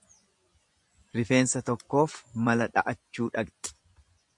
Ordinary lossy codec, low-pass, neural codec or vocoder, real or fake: MP3, 64 kbps; 10.8 kHz; vocoder, 24 kHz, 100 mel bands, Vocos; fake